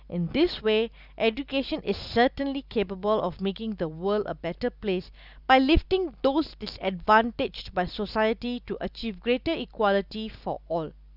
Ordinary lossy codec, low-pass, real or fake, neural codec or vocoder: none; 5.4 kHz; real; none